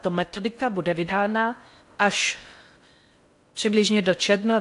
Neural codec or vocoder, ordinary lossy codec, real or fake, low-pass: codec, 16 kHz in and 24 kHz out, 0.6 kbps, FocalCodec, streaming, 4096 codes; AAC, 64 kbps; fake; 10.8 kHz